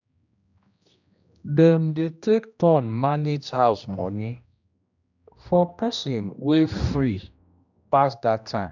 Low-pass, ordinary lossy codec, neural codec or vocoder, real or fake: 7.2 kHz; none; codec, 16 kHz, 1 kbps, X-Codec, HuBERT features, trained on general audio; fake